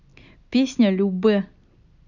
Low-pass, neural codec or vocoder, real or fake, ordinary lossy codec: 7.2 kHz; none; real; none